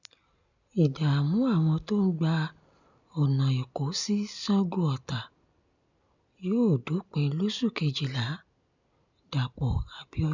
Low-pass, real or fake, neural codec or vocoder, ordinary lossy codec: 7.2 kHz; real; none; none